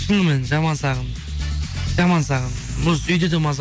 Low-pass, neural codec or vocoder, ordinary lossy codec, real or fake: none; none; none; real